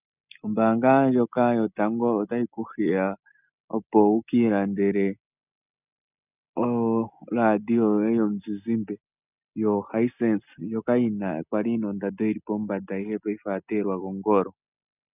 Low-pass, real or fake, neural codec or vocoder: 3.6 kHz; real; none